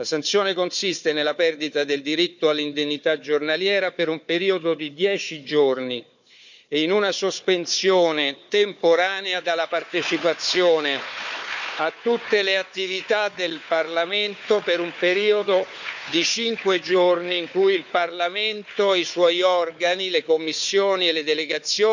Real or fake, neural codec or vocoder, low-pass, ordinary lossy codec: fake; codec, 16 kHz, 4 kbps, FunCodec, trained on Chinese and English, 50 frames a second; 7.2 kHz; none